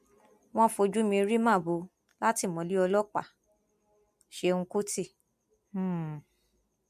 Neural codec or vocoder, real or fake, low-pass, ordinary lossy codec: none; real; 14.4 kHz; MP3, 64 kbps